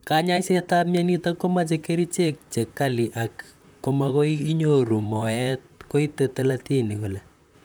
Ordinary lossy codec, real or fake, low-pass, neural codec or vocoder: none; fake; none; vocoder, 44.1 kHz, 128 mel bands, Pupu-Vocoder